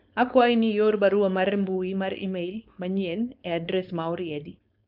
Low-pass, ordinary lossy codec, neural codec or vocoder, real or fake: 5.4 kHz; none; codec, 16 kHz, 4.8 kbps, FACodec; fake